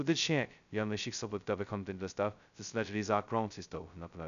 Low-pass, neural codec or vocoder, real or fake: 7.2 kHz; codec, 16 kHz, 0.2 kbps, FocalCodec; fake